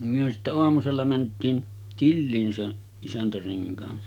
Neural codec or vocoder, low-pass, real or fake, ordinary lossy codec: codec, 44.1 kHz, 7.8 kbps, DAC; 19.8 kHz; fake; none